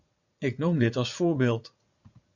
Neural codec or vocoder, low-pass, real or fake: vocoder, 44.1 kHz, 80 mel bands, Vocos; 7.2 kHz; fake